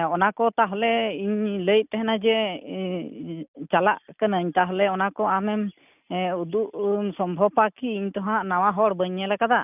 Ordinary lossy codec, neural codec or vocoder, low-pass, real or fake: none; none; 3.6 kHz; real